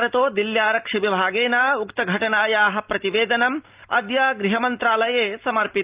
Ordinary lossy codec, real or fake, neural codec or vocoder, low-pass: Opus, 32 kbps; real; none; 3.6 kHz